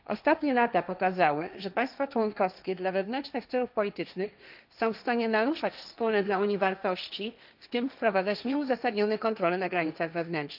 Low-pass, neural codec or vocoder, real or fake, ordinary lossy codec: 5.4 kHz; codec, 16 kHz, 1.1 kbps, Voila-Tokenizer; fake; none